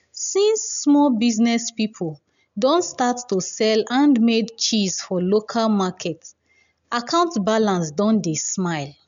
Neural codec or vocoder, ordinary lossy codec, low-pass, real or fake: none; MP3, 96 kbps; 7.2 kHz; real